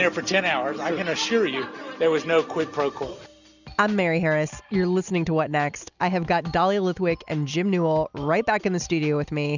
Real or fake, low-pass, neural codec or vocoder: real; 7.2 kHz; none